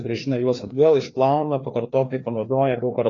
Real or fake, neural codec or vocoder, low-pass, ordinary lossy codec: fake; codec, 16 kHz, 2 kbps, FreqCodec, larger model; 7.2 kHz; AAC, 32 kbps